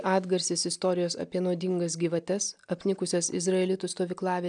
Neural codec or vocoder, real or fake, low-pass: none; real; 9.9 kHz